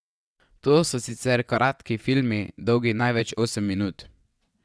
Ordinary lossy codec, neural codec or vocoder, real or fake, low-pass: none; vocoder, 22.05 kHz, 80 mel bands, WaveNeXt; fake; none